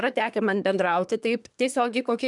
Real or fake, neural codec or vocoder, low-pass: fake; codec, 24 kHz, 1 kbps, SNAC; 10.8 kHz